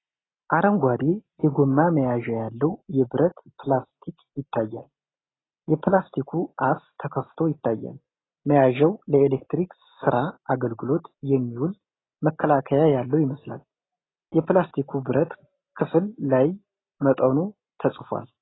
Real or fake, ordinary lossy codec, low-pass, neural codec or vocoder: real; AAC, 16 kbps; 7.2 kHz; none